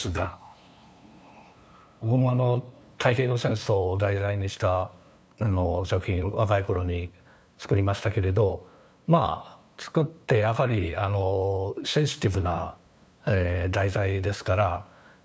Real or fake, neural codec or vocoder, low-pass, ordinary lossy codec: fake; codec, 16 kHz, 2 kbps, FunCodec, trained on LibriTTS, 25 frames a second; none; none